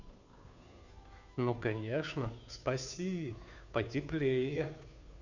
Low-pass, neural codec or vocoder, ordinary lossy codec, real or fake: 7.2 kHz; codec, 16 kHz, 2 kbps, FunCodec, trained on Chinese and English, 25 frames a second; none; fake